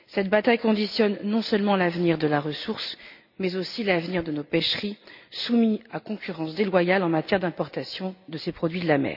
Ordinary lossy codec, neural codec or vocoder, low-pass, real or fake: none; none; 5.4 kHz; real